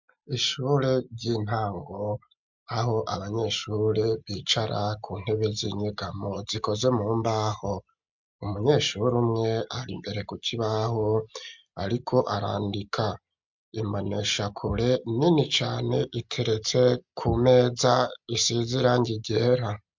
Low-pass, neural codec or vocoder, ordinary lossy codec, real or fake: 7.2 kHz; none; MP3, 64 kbps; real